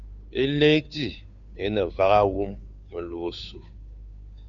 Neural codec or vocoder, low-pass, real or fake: codec, 16 kHz, 2 kbps, FunCodec, trained on Chinese and English, 25 frames a second; 7.2 kHz; fake